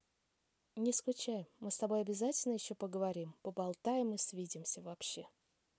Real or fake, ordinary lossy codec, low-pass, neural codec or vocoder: real; none; none; none